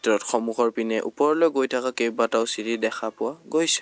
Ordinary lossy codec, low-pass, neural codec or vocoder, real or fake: none; none; none; real